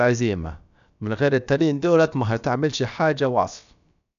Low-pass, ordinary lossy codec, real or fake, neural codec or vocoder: 7.2 kHz; none; fake; codec, 16 kHz, about 1 kbps, DyCAST, with the encoder's durations